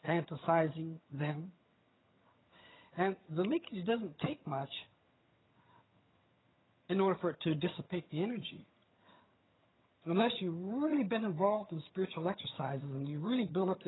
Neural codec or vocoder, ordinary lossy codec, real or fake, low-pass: vocoder, 22.05 kHz, 80 mel bands, HiFi-GAN; AAC, 16 kbps; fake; 7.2 kHz